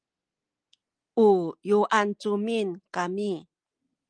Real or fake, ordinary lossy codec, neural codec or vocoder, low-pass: real; Opus, 24 kbps; none; 9.9 kHz